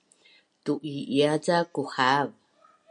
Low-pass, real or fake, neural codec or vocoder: 9.9 kHz; real; none